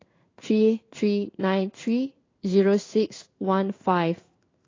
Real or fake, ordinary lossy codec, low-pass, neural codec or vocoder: fake; AAC, 32 kbps; 7.2 kHz; codec, 16 kHz in and 24 kHz out, 1 kbps, XY-Tokenizer